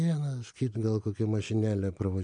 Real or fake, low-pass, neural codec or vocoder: real; 9.9 kHz; none